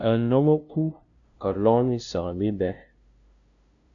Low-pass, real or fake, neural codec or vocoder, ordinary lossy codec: 7.2 kHz; fake; codec, 16 kHz, 0.5 kbps, FunCodec, trained on LibriTTS, 25 frames a second; AAC, 64 kbps